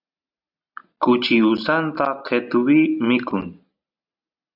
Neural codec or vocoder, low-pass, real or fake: none; 5.4 kHz; real